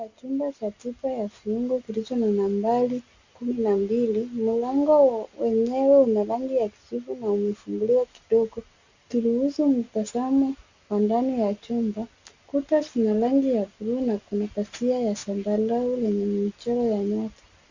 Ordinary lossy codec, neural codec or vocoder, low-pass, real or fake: Opus, 64 kbps; none; 7.2 kHz; real